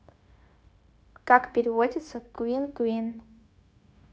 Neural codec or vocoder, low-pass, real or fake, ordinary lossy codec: codec, 16 kHz, 0.9 kbps, LongCat-Audio-Codec; none; fake; none